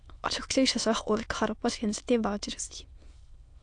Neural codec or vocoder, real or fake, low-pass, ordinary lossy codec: autoencoder, 22.05 kHz, a latent of 192 numbers a frame, VITS, trained on many speakers; fake; 9.9 kHz; AAC, 64 kbps